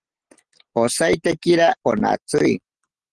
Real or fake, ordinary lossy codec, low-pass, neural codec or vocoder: real; Opus, 16 kbps; 9.9 kHz; none